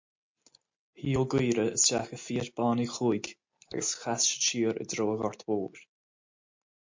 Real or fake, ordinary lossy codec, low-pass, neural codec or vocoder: real; MP3, 48 kbps; 7.2 kHz; none